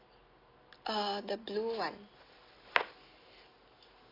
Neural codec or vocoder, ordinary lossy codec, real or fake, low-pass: none; AAC, 24 kbps; real; 5.4 kHz